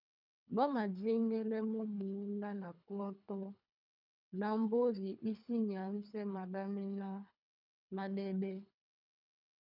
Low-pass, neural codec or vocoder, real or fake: 5.4 kHz; codec, 24 kHz, 3 kbps, HILCodec; fake